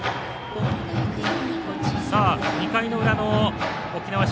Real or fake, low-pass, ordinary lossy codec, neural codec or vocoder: real; none; none; none